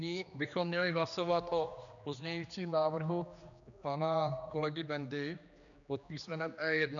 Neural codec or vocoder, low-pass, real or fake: codec, 16 kHz, 2 kbps, X-Codec, HuBERT features, trained on general audio; 7.2 kHz; fake